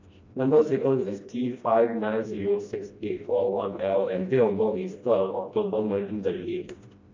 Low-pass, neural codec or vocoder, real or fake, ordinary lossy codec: 7.2 kHz; codec, 16 kHz, 1 kbps, FreqCodec, smaller model; fake; MP3, 48 kbps